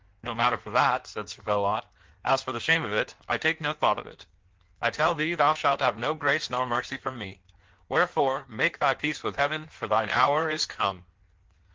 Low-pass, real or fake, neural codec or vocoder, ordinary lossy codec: 7.2 kHz; fake; codec, 16 kHz in and 24 kHz out, 1.1 kbps, FireRedTTS-2 codec; Opus, 16 kbps